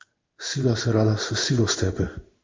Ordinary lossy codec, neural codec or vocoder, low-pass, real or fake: Opus, 32 kbps; codec, 16 kHz in and 24 kHz out, 1 kbps, XY-Tokenizer; 7.2 kHz; fake